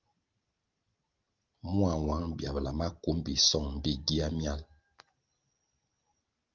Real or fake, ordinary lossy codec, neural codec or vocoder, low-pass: real; Opus, 32 kbps; none; 7.2 kHz